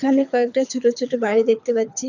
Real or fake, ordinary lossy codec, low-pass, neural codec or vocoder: fake; none; 7.2 kHz; codec, 24 kHz, 6 kbps, HILCodec